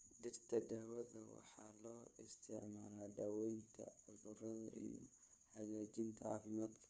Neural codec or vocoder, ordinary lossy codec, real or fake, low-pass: codec, 16 kHz, 4 kbps, FunCodec, trained on LibriTTS, 50 frames a second; none; fake; none